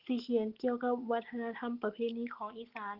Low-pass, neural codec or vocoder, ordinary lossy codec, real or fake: 5.4 kHz; none; Opus, 16 kbps; real